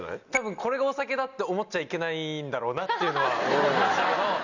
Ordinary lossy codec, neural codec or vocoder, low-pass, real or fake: none; none; 7.2 kHz; real